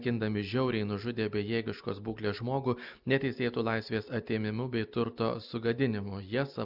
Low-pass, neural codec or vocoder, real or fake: 5.4 kHz; none; real